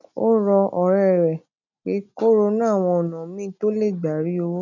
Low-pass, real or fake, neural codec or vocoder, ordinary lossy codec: 7.2 kHz; real; none; none